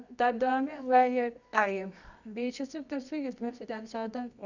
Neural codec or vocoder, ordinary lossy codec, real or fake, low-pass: codec, 24 kHz, 0.9 kbps, WavTokenizer, medium music audio release; none; fake; 7.2 kHz